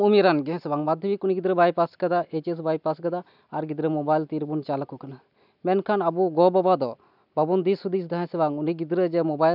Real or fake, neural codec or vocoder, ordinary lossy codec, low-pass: real; none; none; 5.4 kHz